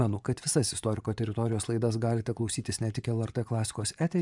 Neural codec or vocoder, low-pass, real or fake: vocoder, 24 kHz, 100 mel bands, Vocos; 10.8 kHz; fake